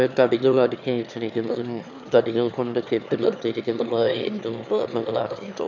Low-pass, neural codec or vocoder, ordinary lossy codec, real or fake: 7.2 kHz; autoencoder, 22.05 kHz, a latent of 192 numbers a frame, VITS, trained on one speaker; none; fake